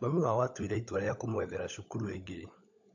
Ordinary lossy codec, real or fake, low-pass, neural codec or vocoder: AAC, 48 kbps; fake; 7.2 kHz; codec, 16 kHz, 16 kbps, FunCodec, trained on LibriTTS, 50 frames a second